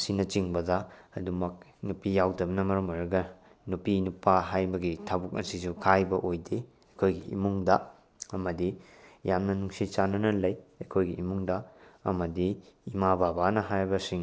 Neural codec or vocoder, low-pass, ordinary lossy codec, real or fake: none; none; none; real